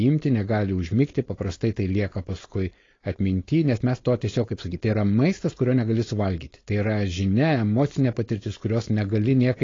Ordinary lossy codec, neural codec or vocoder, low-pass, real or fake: AAC, 32 kbps; codec, 16 kHz, 4.8 kbps, FACodec; 7.2 kHz; fake